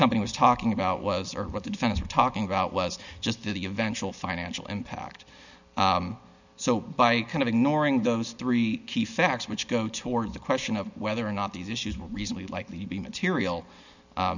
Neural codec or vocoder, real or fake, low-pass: none; real; 7.2 kHz